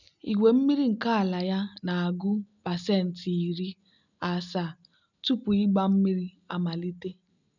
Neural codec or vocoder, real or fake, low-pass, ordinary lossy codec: none; real; 7.2 kHz; none